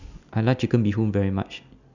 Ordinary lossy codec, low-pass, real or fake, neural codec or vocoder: none; 7.2 kHz; real; none